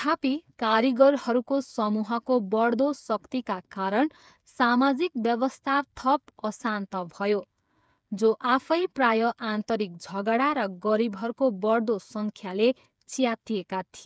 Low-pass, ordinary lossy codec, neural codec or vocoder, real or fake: none; none; codec, 16 kHz, 8 kbps, FreqCodec, smaller model; fake